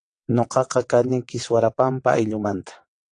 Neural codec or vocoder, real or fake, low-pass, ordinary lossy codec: vocoder, 22.05 kHz, 80 mel bands, WaveNeXt; fake; 9.9 kHz; AAC, 48 kbps